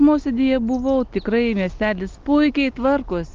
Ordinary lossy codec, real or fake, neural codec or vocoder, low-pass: Opus, 32 kbps; real; none; 7.2 kHz